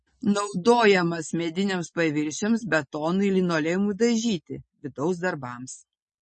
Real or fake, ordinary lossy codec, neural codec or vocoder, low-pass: real; MP3, 32 kbps; none; 10.8 kHz